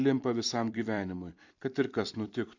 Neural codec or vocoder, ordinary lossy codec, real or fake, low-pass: vocoder, 44.1 kHz, 128 mel bands every 256 samples, BigVGAN v2; AAC, 48 kbps; fake; 7.2 kHz